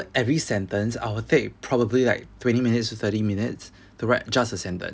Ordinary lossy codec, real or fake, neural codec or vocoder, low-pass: none; real; none; none